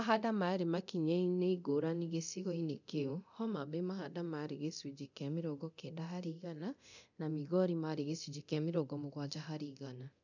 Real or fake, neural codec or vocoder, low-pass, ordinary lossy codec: fake; codec, 24 kHz, 0.9 kbps, DualCodec; 7.2 kHz; none